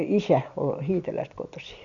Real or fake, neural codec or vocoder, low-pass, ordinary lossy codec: real; none; 7.2 kHz; Opus, 64 kbps